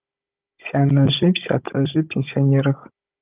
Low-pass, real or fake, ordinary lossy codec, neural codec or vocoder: 3.6 kHz; fake; Opus, 24 kbps; codec, 16 kHz, 16 kbps, FunCodec, trained on Chinese and English, 50 frames a second